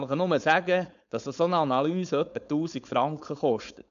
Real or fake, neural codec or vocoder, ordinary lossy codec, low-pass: fake; codec, 16 kHz, 4.8 kbps, FACodec; none; 7.2 kHz